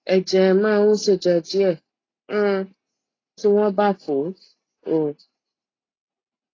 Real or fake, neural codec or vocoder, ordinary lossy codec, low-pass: real; none; AAC, 32 kbps; 7.2 kHz